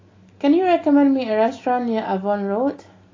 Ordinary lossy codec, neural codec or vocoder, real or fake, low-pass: AAC, 32 kbps; none; real; 7.2 kHz